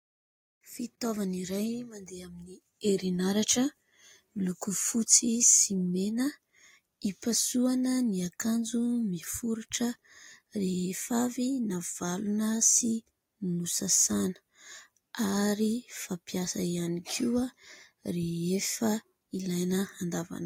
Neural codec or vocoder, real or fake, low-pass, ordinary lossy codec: none; real; 19.8 kHz; AAC, 48 kbps